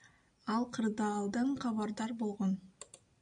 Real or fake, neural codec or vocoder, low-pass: real; none; 9.9 kHz